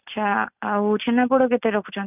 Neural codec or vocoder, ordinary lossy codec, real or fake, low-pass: none; none; real; 3.6 kHz